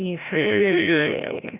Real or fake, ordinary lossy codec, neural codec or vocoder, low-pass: fake; none; codec, 16 kHz, 0.5 kbps, FreqCodec, larger model; 3.6 kHz